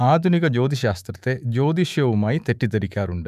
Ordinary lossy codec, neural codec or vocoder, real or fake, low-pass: none; autoencoder, 48 kHz, 128 numbers a frame, DAC-VAE, trained on Japanese speech; fake; 14.4 kHz